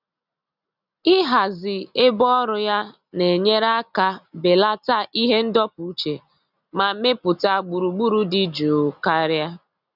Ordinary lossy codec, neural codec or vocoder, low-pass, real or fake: none; none; 5.4 kHz; real